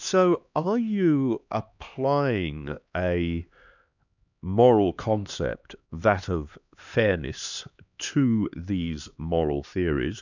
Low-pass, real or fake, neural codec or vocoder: 7.2 kHz; fake; codec, 16 kHz, 2 kbps, X-Codec, HuBERT features, trained on LibriSpeech